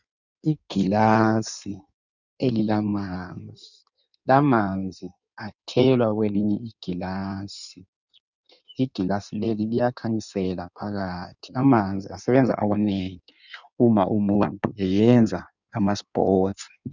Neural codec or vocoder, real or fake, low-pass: codec, 16 kHz in and 24 kHz out, 1.1 kbps, FireRedTTS-2 codec; fake; 7.2 kHz